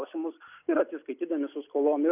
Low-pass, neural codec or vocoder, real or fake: 3.6 kHz; none; real